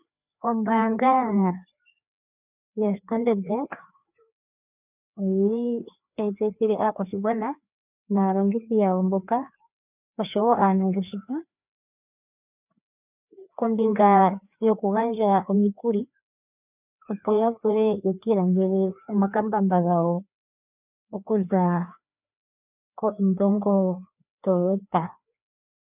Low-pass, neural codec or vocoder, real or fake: 3.6 kHz; codec, 16 kHz, 2 kbps, FreqCodec, larger model; fake